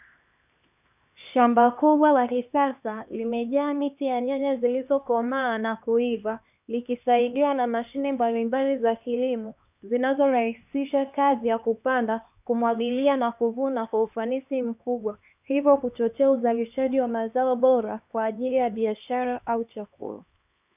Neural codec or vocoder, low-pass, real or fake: codec, 16 kHz, 2 kbps, X-Codec, HuBERT features, trained on LibriSpeech; 3.6 kHz; fake